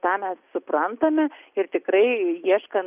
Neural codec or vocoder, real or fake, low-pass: none; real; 3.6 kHz